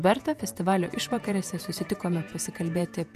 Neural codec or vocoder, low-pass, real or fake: vocoder, 48 kHz, 128 mel bands, Vocos; 14.4 kHz; fake